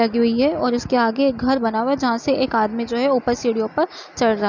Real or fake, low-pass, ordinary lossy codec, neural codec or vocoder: real; 7.2 kHz; none; none